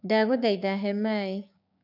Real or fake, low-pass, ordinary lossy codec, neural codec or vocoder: fake; 5.4 kHz; none; codec, 16 kHz, 6 kbps, DAC